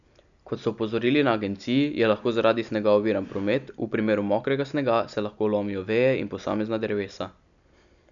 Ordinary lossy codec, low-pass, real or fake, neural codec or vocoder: none; 7.2 kHz; real; none